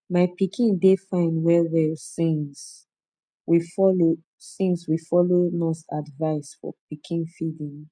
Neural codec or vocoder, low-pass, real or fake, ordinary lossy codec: none; 9.9 kHz; real; none